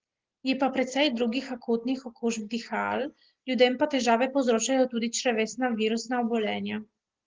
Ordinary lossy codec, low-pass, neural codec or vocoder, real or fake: Opus, 16 kbps; 7.2 kHz; none; real